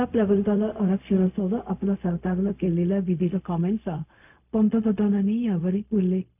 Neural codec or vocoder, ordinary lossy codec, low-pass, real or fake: codec, 16 kHz, 0.4 kbps, LongCat-Audio-Codec; none; 3.6 kHz; fake